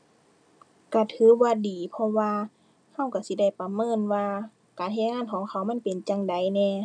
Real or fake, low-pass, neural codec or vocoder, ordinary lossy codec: real; 9.9 kHz; none; none